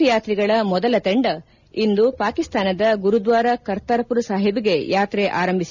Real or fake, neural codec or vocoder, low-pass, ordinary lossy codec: real; none; 7.2 kHz; none